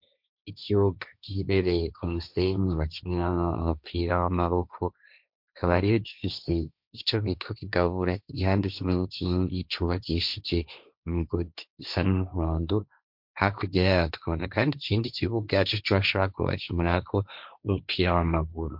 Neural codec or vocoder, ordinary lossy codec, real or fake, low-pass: codec, 16 kHz, 1.1 kbps, Voila-Tokenizer; MP3, 48 kbps; fake; 5.4 kHz